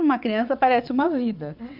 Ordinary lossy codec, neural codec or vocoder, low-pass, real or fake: none; vocoder, 22.05 kHz, 80 mel bands, WaveNeXt; 5.4 kHz; fake